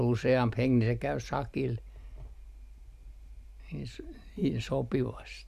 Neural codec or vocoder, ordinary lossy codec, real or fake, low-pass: none; none; real; 14.4 kHz